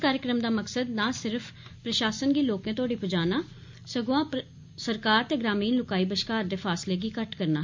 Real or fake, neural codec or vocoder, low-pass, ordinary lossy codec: real; none; 7.2 kHz; MP3, 48 kbps